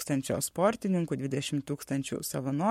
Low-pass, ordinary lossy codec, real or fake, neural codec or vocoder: 19.8 kHz; MP3, 64 kbps; fake; codec, 44.1 kHz, 7.8 kbps, Pupu-Codec